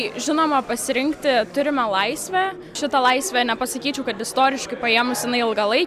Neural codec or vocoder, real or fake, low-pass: none; real; 14.4 kHz